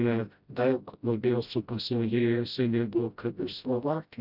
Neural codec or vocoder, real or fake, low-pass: codec, 16 kHz, 0.5 kbps, FreqCodec, smaller model; fake; 5.4 kHz